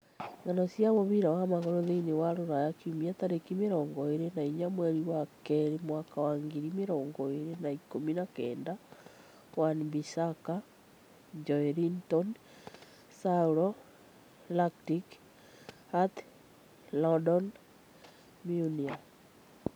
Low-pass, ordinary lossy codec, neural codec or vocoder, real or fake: none; none; none; real